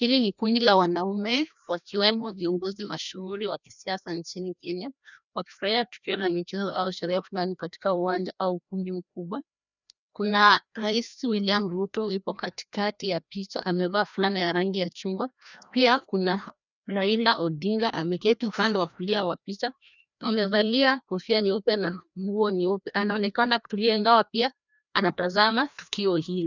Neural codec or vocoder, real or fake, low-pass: codec, 16 kHz, 1 kbps, FreqCodec, larger model; fake; 7.2 kHz